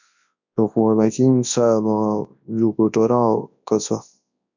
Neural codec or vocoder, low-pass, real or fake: codec, 24 kHz, 0.9 kbps, WavTokenizer, large speech release; 7.2 kHz; fake